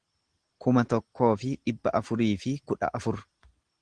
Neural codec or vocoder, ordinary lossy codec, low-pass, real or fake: none; Opus, 16 kbps; 9.9 kHz; real